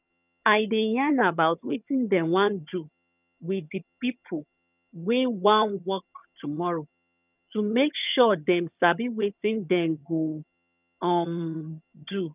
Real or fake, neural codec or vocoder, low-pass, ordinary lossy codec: fake; vocoder, 22.05 kHz, 80 mel bands, HiFi-GAN; 3.6 kHz; none